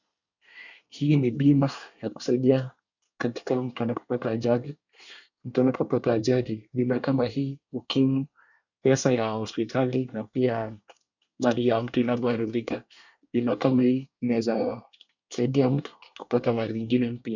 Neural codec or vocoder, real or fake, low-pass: codec, 24 kHz, 1 kbps, SNAC; fake; 7.2 kHz